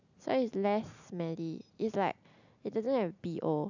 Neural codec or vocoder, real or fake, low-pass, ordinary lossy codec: none; real; 7.2 kHz; none